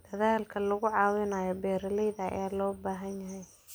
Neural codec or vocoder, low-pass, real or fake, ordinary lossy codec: none; none; real; none